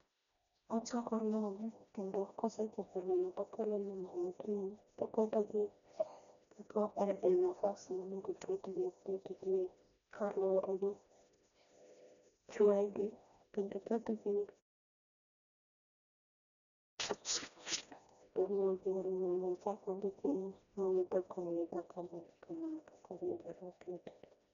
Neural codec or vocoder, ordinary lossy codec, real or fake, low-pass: codec, 16 kHz, 1 kbps, FreqCodec, smaller model; none; fake; 7.2 kHz